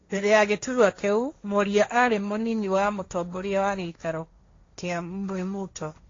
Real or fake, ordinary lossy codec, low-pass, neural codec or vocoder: fake; AAC, 32 kbps; 7.2 kHz; codec, 16 kHz, 1.1 kbps, Voila-Tokenizer